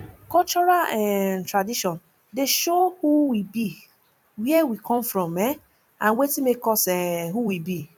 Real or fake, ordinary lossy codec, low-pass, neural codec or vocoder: fake; none; 19.8 kHz; vocoder, 44.1 kHz, 128 mel bands every 256 samples, BigVGAN v2